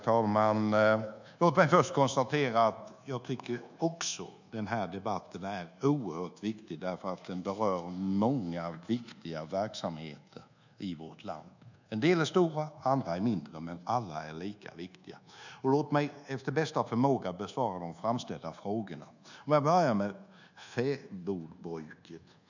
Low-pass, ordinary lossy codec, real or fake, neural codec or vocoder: 7.2 kHz; none; fake; codec, 24 kHz, 1.2 kbps, DualCodec